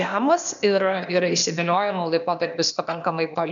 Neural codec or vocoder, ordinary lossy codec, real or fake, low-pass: codec, 16 kHz, 0.8 kbps, ZipCodec; MP3, 96 kbps; fake; 7.2 kHz